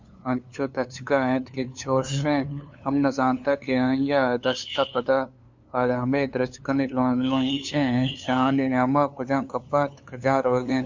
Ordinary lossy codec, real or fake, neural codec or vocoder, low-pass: MP3, 64 kbps; fake; codec, 16 kHz, 2 kbps, FunCodec, trained on LibriTTS, 25 frames a second; 7.2 kHz